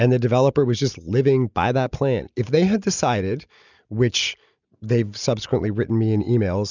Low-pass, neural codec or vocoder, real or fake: 7.2 kHz; none; real